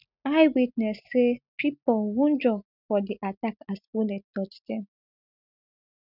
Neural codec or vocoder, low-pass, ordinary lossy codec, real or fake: none; 5.4 kHz; none; real